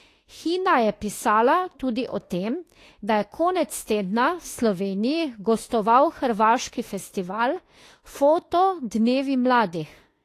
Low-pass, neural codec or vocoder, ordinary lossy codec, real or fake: 14.4 kHz; autoencoder, 48 kHz, 32 numbers a frame, DAC-VAE, trained on Japanese speech; AAC, 48 kbps; fake